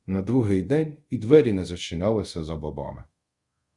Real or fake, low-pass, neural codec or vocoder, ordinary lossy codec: fake; 10.8 kHz; codec, 24 kHz, 0.5 kbps, DualCodec; Opus, 64 kbps